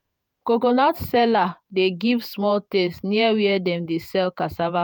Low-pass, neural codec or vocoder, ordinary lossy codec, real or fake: none; vocoder, 48 kHz, 128 mel bands, Vocos; none; fake